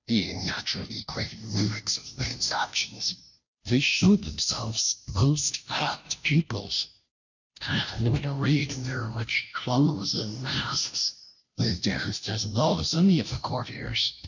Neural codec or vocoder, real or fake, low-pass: codec, 16 kHz, 0.5 kbps, FunCodec, trained on Chinese and English, 25 frames a second; fake; 7.2 kHz